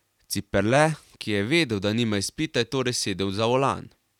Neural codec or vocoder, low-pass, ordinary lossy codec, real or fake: none; 19.8 kHz; none; real